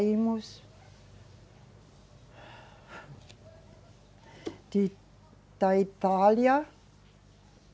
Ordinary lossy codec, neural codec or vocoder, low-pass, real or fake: none; none; none; real